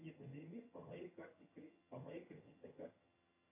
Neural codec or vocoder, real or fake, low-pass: vocoder, 22.05 kHz, 80 mel bands, HiFi-GAN; fake; 3.6 kHz